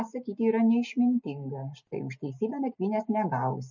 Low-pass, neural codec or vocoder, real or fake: 7.2 kHz; none; real